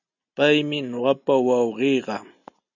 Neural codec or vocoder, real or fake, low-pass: none; real; 7.2 kHz